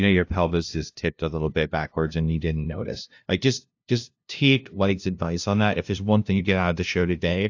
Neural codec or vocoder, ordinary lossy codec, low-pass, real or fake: codec, 16 kHz, 0.5 kbps, FunCodec, trained on LibriTTS, 25 frames a second; AAC, 48 kbps; 7.2 kHz; fake